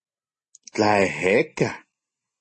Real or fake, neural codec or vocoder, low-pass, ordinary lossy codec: real; none; 9.9 kHz; MP3, 32 kbps